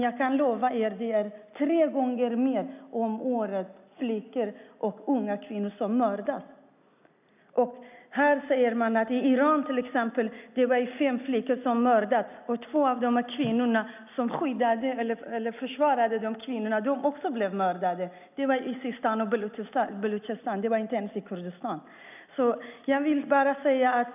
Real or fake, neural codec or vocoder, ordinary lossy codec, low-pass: real; none; none; 3.6 kHz